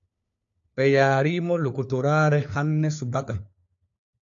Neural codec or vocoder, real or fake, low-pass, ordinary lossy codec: codec, 16 kHz, 4 kbps, FunCodec, trained on LibriTTS, 50 frames a second; fake; 7.2 kHz; AAC, 64 kbps